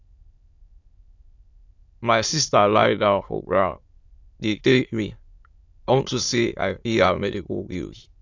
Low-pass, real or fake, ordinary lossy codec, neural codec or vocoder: 7.2 kHz; fake; AAC, 48 kbps; autoencoder, 22.05 kHz, a latent of 192 numbers a frame, VITS, trained on many speakers